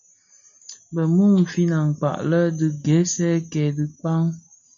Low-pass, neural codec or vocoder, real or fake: 7.2 kHz; none; real